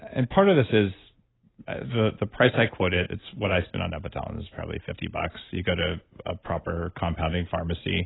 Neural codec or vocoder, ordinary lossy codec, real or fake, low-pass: none; AAC, 16 kbps; real; 7.2 kHz